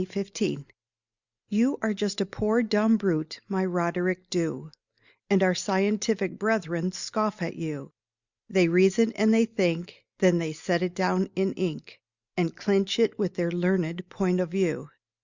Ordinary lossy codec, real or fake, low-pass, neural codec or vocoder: Opus, 64 kbps; real; 7.2 kHz; none